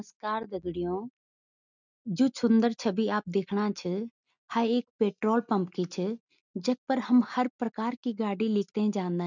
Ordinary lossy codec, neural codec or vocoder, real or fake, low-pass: none; none; real; 7.2 kHz